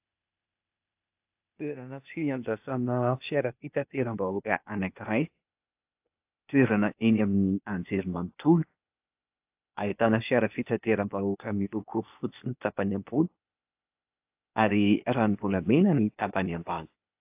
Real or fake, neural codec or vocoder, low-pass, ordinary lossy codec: fake; codec, 16 kHz, 0.8 kbps, ZipCodec; 3.6 kHz; AAC, 32 kbps